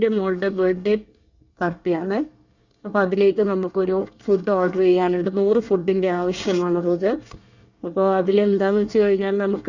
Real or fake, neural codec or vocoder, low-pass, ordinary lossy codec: fake; codec, 24 kHz, 1 kbps, SNAC; 7.2 kHz; Opus, 64 kbps